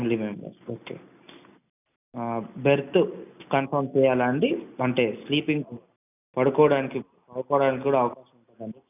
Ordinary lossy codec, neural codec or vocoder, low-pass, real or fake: none; none; 3.6 kHz; real